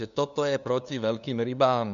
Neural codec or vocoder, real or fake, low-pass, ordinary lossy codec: codec, 16 kHz, 2 kbps, FunCodec, trained on LibriTTS, 25 frames a second; fake; 7.2 kHz; MP3, 96 kbps